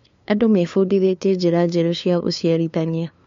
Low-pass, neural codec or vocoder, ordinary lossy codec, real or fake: 7.2 kHz; codec, 16 kHz, 2 kbps, FunCodec, trained on LibriTTS, 25 frames a second; MP3, 48 kbps; fake